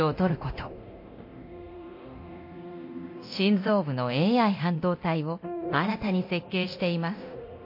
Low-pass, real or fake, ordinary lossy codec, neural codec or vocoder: 5.4 kHz; fake; MP3, 32 kbps; codec, 24 kHz, 0.9 kbps, DualCodec